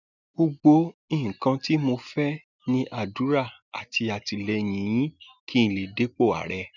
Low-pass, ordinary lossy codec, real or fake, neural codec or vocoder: 7.2 kHz; none; real; none